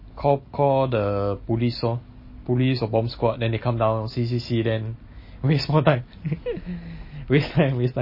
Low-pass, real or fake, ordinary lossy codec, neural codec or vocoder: 5.4 kHz; real; MP3, 24 kbps; none